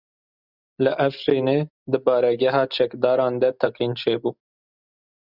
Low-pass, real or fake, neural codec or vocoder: 5.4 kHz; real; none